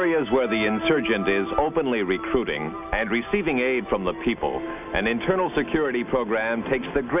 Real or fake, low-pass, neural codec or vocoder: real; 3.6 kHz; none